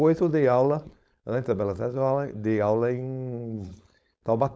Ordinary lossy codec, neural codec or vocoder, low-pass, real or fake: none; codec, 16 kHz, 4.8 kbps, FACodec; none; fake